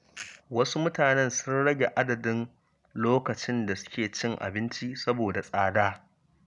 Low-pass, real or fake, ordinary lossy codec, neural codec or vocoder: 10.8 kHz; real; none; none